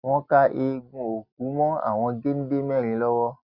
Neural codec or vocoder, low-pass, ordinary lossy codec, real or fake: none; 5.4 kHz; Opus, 64 kbps; real